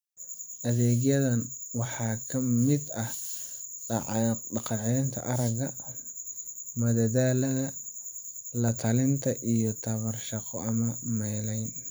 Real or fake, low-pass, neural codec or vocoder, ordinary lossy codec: fake; none; vocoder, 44.1 kHz, 128 mel bands every 512 samples, BigVGAN v2; none